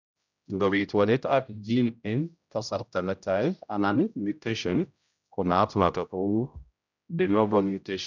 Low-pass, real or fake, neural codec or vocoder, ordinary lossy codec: 7.2 kHz; fake; codec, 16 kHz, 0.5 kbps, X-Codec, HuBERT features, trained on general audio; none